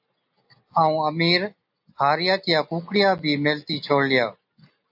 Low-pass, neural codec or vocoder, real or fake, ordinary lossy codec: 5.4 kHz; none; real; AAC, 48 kbps